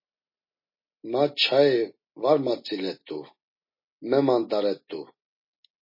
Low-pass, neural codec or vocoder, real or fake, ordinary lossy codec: 5.4 kHz; none; real; MP3, 24 kbps